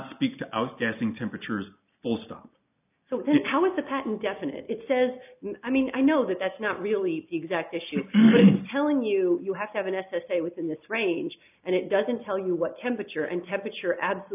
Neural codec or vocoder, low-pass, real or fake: none; 3.6 kHz; real